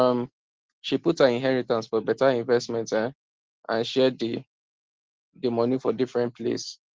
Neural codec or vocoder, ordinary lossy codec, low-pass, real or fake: none; Opus, 16 kbps; 7.2 kHz; real